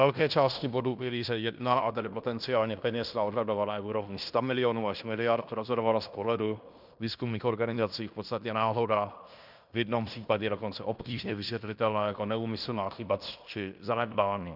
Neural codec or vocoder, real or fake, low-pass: codec, 16 kHz in and 24 kHz out, 0.9 kbps, LongCat-Audio-Codec, fine tuned four codebook decoder; fake; 5.4 kHz